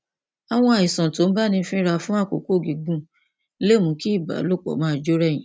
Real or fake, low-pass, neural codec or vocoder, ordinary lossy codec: real; none; none; none